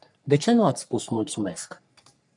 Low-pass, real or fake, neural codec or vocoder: 10.8 kHz; fake; codec, 44.1 kHz, 3.4 kbps, Pupu-Codec